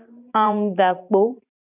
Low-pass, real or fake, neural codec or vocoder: 3.6 kHz; fake; vocoder, 44.1 kHz, 128 mel bands every 512 samples, BigVGAN v2